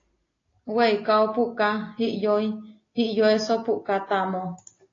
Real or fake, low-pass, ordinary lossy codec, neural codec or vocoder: real; 7.2 kHz; AAC, 32 kbps; none